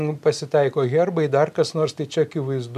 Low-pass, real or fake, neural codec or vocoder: 14.4 kHz; real; none